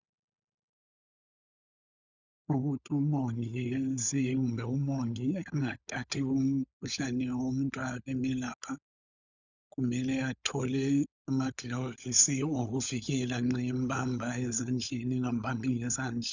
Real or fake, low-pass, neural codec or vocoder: fake; 7.2 kHz; codec, 16 kHz, 16 kbps, FunCodec, trained on LibriTTS, 50 frames a second